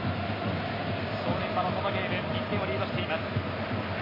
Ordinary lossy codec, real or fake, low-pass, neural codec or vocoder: MP3, 24 kbps; real; 5.4 kHz; none